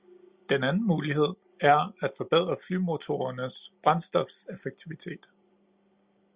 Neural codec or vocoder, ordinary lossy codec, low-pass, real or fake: none; Opus, 64 kbps; 3.6 kHz; real